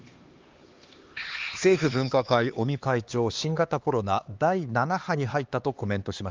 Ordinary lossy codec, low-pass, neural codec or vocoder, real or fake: Opus, 32 kbps; 7.2 kHz; codec, 16 kHz, 2 kbps, X-Codec, HuBERT features, trained on LibriSpeech; fake